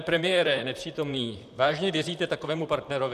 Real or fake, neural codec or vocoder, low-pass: fake; vocoder, 44.1 kHz, 128 mel bands, Pupu-Vocoder; 14.4 kHz